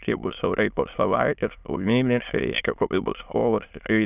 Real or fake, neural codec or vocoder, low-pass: fake; autoencoder, 22.05 kHz, a latent of 192 numbers a frame, VITS, trained on many speakers; 3.6 kHz